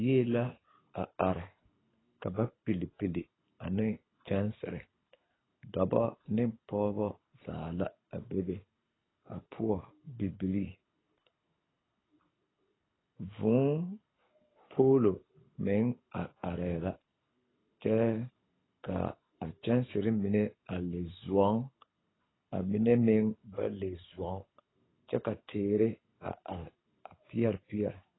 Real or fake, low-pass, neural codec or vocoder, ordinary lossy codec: fake; 7.2 kHz; codec, 24 kHz, 6 kbps, HILCodec; AAC, 16 kbps